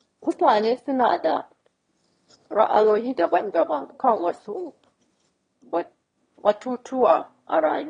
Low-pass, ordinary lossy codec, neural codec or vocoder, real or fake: 9.9 kHz; AAC, 32 kbps; autoencoder, 22.05 kHz, a latent of 192 numbers a frame, VITS, trained on one speaker; fake